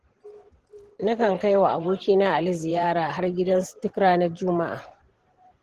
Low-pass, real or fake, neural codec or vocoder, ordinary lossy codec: 14.4 kHz; fake; vocoder, 44.1 kHz, 128 mel bands every 512 samples, BigVGAN v2; Opus, 16 kbps